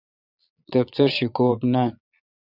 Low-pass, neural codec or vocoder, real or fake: 5.4 kHz; vocoder, 22.05 kHz, 80 mel bands, Vocos; fake